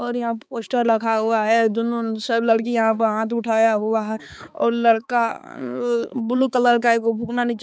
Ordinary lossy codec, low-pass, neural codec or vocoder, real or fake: none; none; codec, 16 kHz, 4 kbps, X-Codec, HuBERT features, trained on balanced general audio; fake